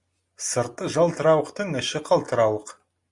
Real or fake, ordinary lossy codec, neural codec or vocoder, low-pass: real; Opus, 64 kbps; none; 10.8 kHz